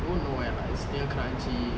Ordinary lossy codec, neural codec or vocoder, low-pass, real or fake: none; none; none; real